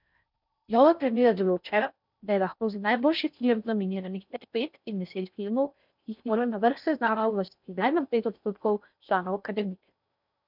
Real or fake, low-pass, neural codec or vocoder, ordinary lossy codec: fake; 5.4 kHz; codec, 16 kHz in and 24 kHz out, 0.6 kbps, FocalCodec, streaming, 4096 codes; none